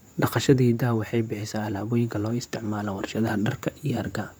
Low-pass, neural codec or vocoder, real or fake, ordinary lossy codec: none; vocoder, 44.1 kHz, 128 mel bands, Pupu-Vocoder; fake; none